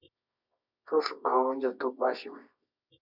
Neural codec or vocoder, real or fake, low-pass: codec, 24 kHz, 0.9 kbps, WavTokenizer, medium music audio release; fake; 5.4 kHz